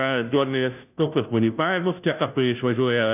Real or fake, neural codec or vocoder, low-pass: fake; codec, 16 kHz, 0.5 kbps, FunCodec, trained on Chinese and English, 25 frames a second; 3.6 kHz